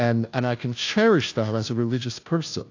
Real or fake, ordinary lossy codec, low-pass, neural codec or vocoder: fake; AAC, 48 kbps; 7.2 kHz; codec, 16 kHz, 0.5 kbps, FunCodec, trained on Chinese and English, 25 frames a second